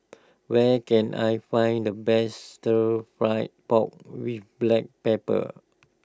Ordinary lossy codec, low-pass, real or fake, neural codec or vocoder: none; none; real; none